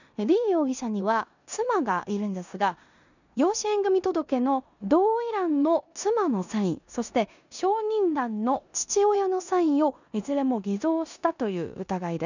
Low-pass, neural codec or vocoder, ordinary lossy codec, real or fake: 7.2 kHz; codec, 16 kHz in and 24 kHz out, 0.9 kbps, LongCat-Audio-Codec, four codebook decoder; none; fake